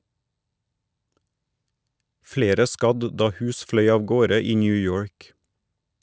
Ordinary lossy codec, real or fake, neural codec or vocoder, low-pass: none; real; none; none